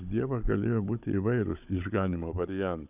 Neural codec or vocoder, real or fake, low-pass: codec, 16 kHz, 16 kbps, FunCodec, trained on Chinese and English, 50 frames a second; fake; 3.6 kHz